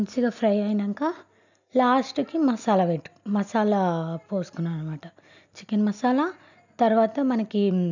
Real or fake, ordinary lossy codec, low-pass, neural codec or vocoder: real; none; 7.2 kHz; none